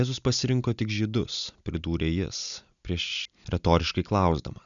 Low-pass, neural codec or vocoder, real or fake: 7.2 kHz; none; real